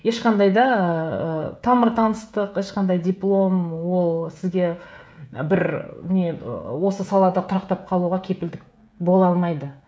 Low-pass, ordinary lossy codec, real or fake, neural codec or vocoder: none; none; fake; codec, 16 kHz, 16 kbps, FreqCodec, smaller model